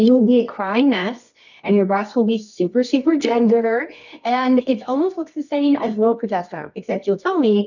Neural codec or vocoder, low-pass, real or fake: codec, 24 kHz, 0.9 kbps, WavTokenizer, medium music audio release; 7.2 kHz; fake